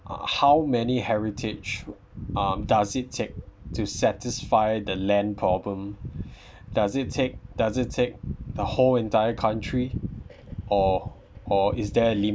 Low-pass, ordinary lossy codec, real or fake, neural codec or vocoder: none; none; real; none